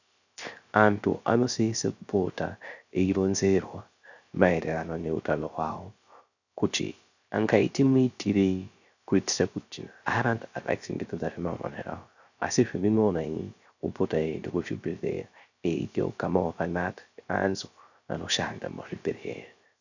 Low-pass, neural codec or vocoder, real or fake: 7.2 kHz; codec, 16 kHz, 0.3 kbps, FocalCodec; fake